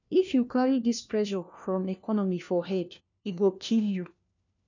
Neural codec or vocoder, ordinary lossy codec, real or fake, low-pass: codec, 16 kHz, 1 kbps, FunCodec, trained on LibriTTS, 50 frames a second; none; fake; 7.2 kHz